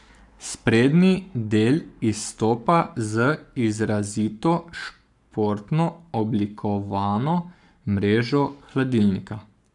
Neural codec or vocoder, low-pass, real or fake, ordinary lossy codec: codec, 44.1 kHz, 7.8 kbps, Pupu-Codec; 10.8 kHz; fake; none